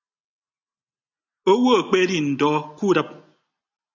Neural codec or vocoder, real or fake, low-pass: none; real; 7.2 kHz